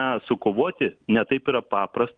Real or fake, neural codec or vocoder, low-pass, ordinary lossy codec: real; none; 9.9 kHz; Opus, 32 kbps